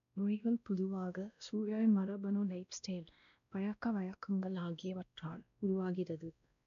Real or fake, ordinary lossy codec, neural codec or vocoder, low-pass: fake; MP3, 64 kbps; codec, 16 kHz, 1 kbps, X-Codec, WavLM features, trained on Multilingual LibriSpeech; 7.2 kHz